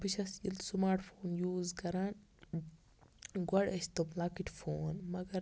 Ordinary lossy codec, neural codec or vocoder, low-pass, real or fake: none; none; none; real